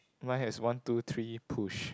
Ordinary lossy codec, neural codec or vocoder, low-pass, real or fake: none; none; none; real